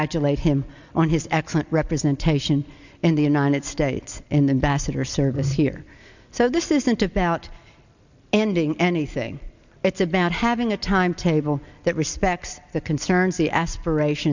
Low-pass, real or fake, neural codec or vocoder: 7.2 kHz; real; none